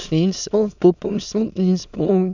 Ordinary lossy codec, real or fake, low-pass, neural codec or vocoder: none; fake; 7.2 kHz; autoencoder, 22.05 kHz, a latent of 192 numbers a frame, VITS, trained on many speakers